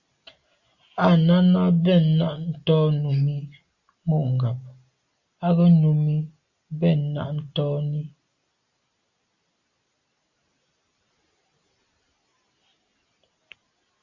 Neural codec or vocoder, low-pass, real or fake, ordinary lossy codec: none; 7.2 kHz; real; Opus, 64 kbps